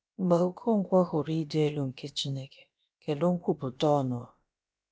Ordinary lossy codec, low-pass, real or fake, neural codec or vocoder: none; none; fake; codec, 16 kHz, about 1 kbps, DyCAST, with the encoder's durations